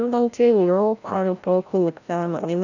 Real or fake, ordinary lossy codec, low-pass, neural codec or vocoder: fake; none; 7.2 kHz; codec, 16 kHz, 0.5 kbps, FreqCodec, larger model